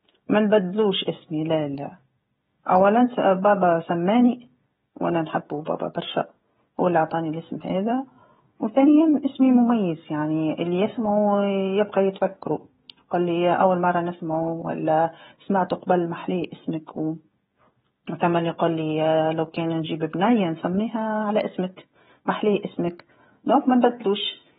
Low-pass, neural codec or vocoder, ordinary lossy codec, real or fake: 7.2 kHz; codec, 16 kHz, 16 kbps, FreqCodec, smaller model; AAC, 16 kbps; fake